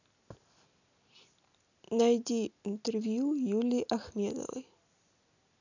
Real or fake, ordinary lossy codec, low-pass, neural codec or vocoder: real; none; 7.2 kHz; none